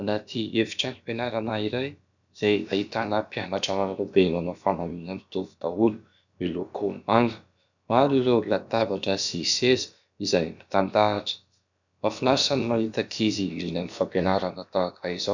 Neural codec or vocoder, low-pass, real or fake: codec, 16 kHz, about 1 kbps, DyCAST, with the encoder's durations; 7.2 kHz; fake